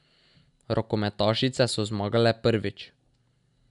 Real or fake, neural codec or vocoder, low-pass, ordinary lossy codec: real; none; 10.8 kHz; none